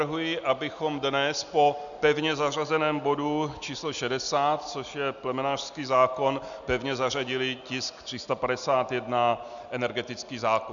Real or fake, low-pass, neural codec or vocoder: real; 7.2 kHz; none